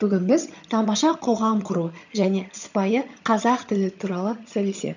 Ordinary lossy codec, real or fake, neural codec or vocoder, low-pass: none; fake; vocoder, 22.05 kHz, 80 mel bands, HiFi-GAN; 7.2 kHz